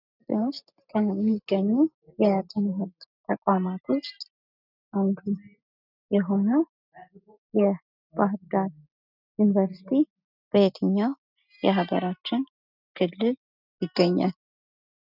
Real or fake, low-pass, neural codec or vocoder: real; 5.4 kHz; none